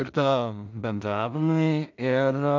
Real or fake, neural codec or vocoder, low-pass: fake; codec, 16 kHz in and 24 kHz out, 0.4 kbps, LongCat-Audio-Codec, two codebook decoder; 7.2 kHz